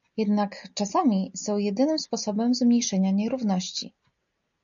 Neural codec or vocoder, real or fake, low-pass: none; real; 7.2 kHz